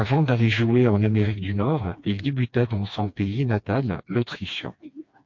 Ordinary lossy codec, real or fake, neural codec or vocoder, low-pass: MP3, 48 kbps; fake; codec, 16 kHz, 2 kbps, FreqCodec, smaller model; 7.2 kHz